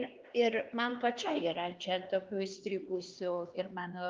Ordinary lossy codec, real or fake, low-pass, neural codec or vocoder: Opus, 32 kbps; fake; 7.2 kHz; codec, 16 kHz, 2 kbps, X-Codec, HuBERT features, trained on LibriSpeech